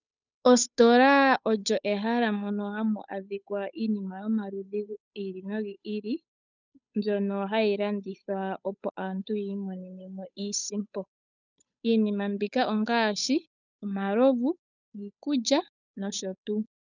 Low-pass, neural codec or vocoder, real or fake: 7.2 kHz; codec, 16 kHz, 8 kbps, FunCodec, trained on Chinese and English, 25 frames a second; fake